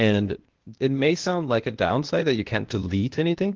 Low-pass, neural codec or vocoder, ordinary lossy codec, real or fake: 7.2 kHz; codec, 16 kHz, 0.8 kbps, ZipCodec; Opus, 16 kbps; fake